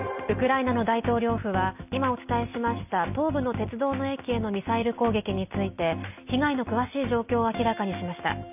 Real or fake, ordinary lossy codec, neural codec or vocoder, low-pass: real; none; none; 3.6 kHz